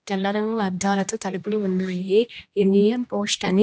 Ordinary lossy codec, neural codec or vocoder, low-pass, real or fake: none; codec, 16 kHz, 1 kbps, X-Codec, HuBERT features, trained on general audio; none; fake